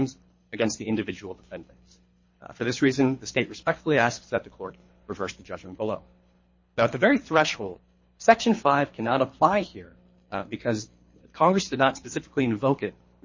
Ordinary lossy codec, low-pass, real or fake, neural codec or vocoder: MP3, 32 kbps; 7.2 kHz; fake; codec, 24 kHz, 3 kbps, HILCodec